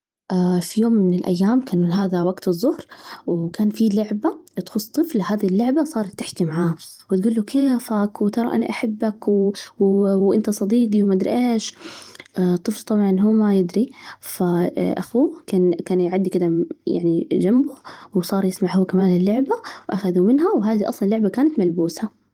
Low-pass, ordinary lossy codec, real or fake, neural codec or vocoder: 19.8 kHz; Opus, 24 kbps; fake; vocoder, 44.1 kHz, 128 mel bands every 512 samples, BigVGAN v2